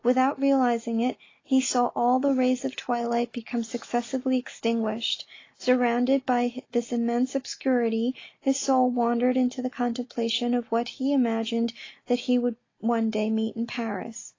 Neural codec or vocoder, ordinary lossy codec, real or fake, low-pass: none; AAC, 32 kbps; real; 7.2 kHz